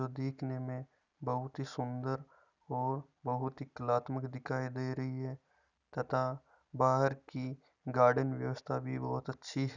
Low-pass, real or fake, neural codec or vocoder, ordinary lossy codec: 7.2 kHz; real; none; none